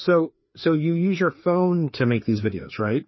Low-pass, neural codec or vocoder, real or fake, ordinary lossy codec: 7.2 kHz; codec, 16 kHz, 2 kbps, FreqCodec, larger model; fake; MP3, 24 kbps